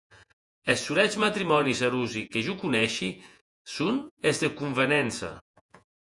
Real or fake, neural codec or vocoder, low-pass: fake; vocoder, 48 kHz, 128 mel bands, Vocos; 10.8 kHz